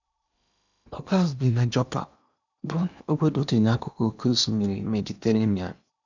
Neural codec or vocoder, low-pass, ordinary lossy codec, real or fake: codec, 16 kHz in and 24 kHz out, 0.8 kbps, FocalCodec, streaming, 65536 codes; 7.2 kHz; none; fake